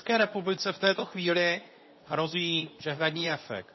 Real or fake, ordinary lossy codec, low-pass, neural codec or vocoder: fake; MP3, 24 kbps; 7.2 kHz; codec, 24 kHz, 0.9 kbps, WavTokenizer, medium speech release version 2